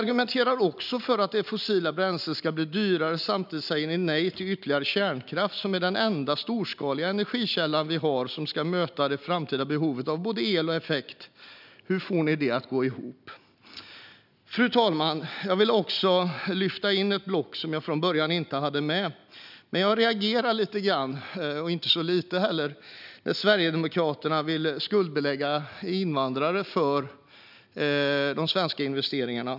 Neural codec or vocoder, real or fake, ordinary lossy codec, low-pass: none; real; none; 5.4 kHz